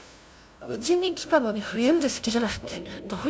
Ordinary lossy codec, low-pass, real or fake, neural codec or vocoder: none; none; fake; codec, 16 kHz, 0.5 kbps, FunCodec, trained on LibriTTS, 25 frames a second